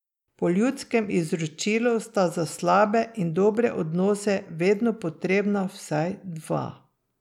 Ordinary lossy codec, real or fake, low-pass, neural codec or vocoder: none; real; 19.8 kHz; none